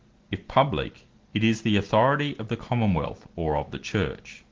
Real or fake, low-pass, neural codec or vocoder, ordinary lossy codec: real; 7.2 kHz; none; Opus, 16 kbps